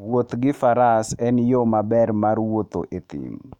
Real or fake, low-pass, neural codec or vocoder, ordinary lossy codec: fake; 19.8 kHz; autoencoder, 48 kHz, 128 numbers a frame, DAC-VAE, trained on Japanese speech; none